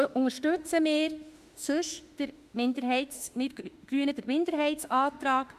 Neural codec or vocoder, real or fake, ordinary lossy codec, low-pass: autoencoder, 48 kHz, 32 numbers a frame, DAC-VAE, trained on Japanese speech; fake; Opus, 64 kbps; 14.4 kHz